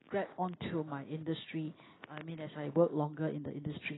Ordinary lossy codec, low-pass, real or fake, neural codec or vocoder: AAC, 16 kbps; 7.2 kHz; real; none